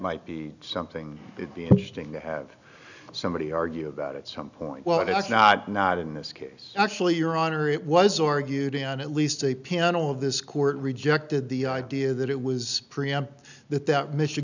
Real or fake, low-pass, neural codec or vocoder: real; 7.2 kHz; none